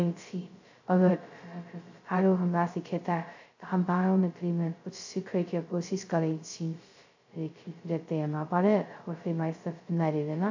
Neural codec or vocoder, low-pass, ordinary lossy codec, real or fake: codec, 16 kHz, 0.2 kbps, FocalCodec; 7.2 kHz; AAC, 48 kbps; fake